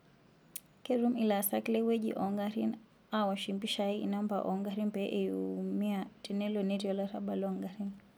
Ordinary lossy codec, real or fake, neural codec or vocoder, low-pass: none; real; none; none